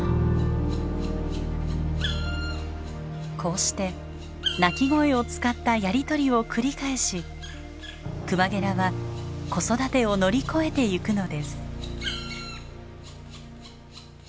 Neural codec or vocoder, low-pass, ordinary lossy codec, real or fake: none; none; none; real